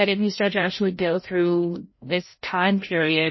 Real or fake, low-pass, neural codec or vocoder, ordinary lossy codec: fake; 7.2 kHz; codec, 16 kHz, 0.5 kbps, FreqCodec, larger model; MP3, 24 kbps